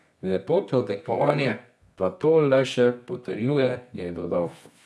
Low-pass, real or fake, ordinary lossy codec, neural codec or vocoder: none; fake; none; codec, 24 kHz, 0.9 kbps, WavTokenizer, medium music audio release